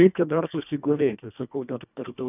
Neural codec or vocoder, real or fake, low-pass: codec, 24 kHz, 1.5 kbps, HILCodec; fake; 3.6 kHz